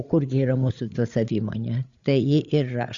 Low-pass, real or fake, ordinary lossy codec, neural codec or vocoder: 7.2 kHz; fake; Opus, 64 kbps; codec, 16 kHz, 8 kbps, FreqCodec, larger model